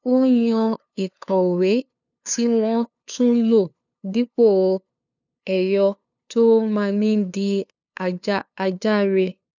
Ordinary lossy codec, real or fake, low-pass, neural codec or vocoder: none; fake; 7.2 kHz; codec, 16 kHz, 2 kbps, FunCodec, trained on LibriTTS, 25 frames a second